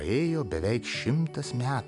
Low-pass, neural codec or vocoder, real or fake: 10.8 kHz; none; real